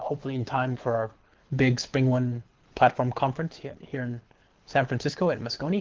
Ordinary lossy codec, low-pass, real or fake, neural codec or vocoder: Opus, 16 kbps; 7.2 kHz; fake; codec, 24 kHz, 6 kbps, HILCodec